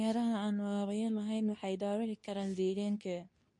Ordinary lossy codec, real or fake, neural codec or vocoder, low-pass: MP3, 48 kbps; fake; codec, 24 kHz, 0.9 kbps, WavTokenizer, medium speech release version 2; 10.8 kHz